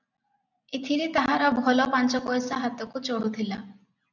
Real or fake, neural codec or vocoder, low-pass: real; none; 7.2 kHz